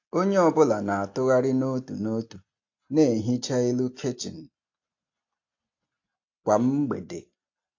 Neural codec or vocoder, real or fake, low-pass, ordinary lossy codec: none; real; 7.2 kHz; AAC, 48 kbps